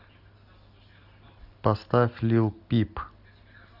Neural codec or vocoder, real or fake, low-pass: none; real; 5.4 kHz